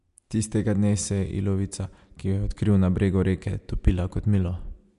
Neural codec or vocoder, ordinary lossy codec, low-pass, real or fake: none; MP3, 64 kbps; 10.8 kHz; real